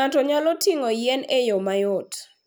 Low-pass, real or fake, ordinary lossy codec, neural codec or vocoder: none; real; none; none